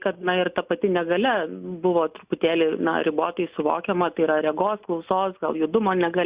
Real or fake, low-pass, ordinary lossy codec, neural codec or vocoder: real; 3.6 kHz; Opus, 64 kbps; none